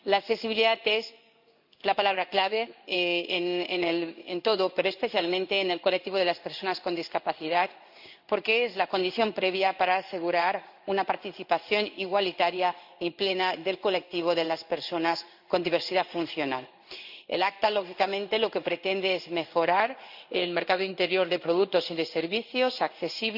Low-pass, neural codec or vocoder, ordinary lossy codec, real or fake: 5.4 kHz; codec, 16 kHz in and 24 kHz out, 1 kbps, XY-Tokenizer; none; fake